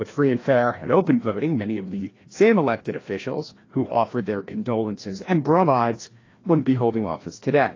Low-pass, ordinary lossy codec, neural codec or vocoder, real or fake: 7.2 kHz; AAC, 32 kbps; codec, 16 kHz, 1 kbps, FreqCodec, larger model; fake